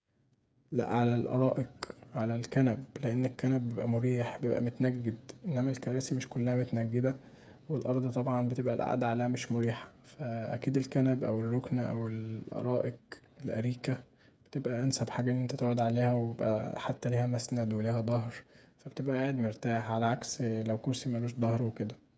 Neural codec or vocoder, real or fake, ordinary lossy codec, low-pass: codec, 16 kHz, 8 kbps, FreqCodec, smaller model; fake; none; none